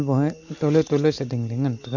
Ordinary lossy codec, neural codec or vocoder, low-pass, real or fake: none; none; 7.2 kHz; real